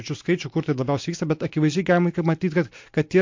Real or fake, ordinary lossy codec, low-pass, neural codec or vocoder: real; MP3, 48 kbps; 7.2 kHz; none